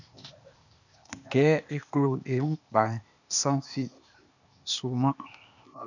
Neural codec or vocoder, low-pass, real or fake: codec, 16 kHz, 0.8 kbps, ZipCodec; 7.2 kHz; fake